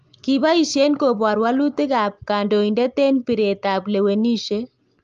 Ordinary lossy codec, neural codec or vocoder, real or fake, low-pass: Opus, 32 kbps; none; real; 7.2 kHz